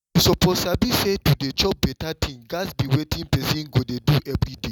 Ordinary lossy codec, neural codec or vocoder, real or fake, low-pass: none; none; real; 19.8 kHz